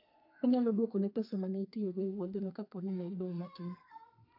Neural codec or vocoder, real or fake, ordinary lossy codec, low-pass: codec, 32 kHz, 1.9 kbps, SNAC; fake; AAC, 48 kbps; 5.4 kHz